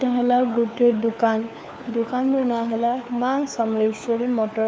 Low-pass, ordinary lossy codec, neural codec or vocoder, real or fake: none; none; codec, 16 kHz, 4 kbps, FunCodec, trained on LibriTTS, 50 frames a second; fake